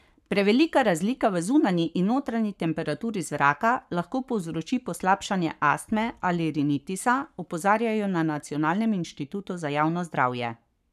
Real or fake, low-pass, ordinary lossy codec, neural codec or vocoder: fake; 14.4 kHz; none; codec, 44.1 kHz, 7.8 kbps, Pupu-Codec